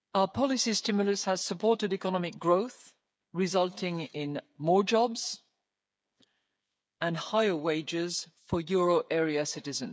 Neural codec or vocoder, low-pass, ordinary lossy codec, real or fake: codec, 16 kHz, 8 kbps, FreqCodec, smaller model; none; none; fake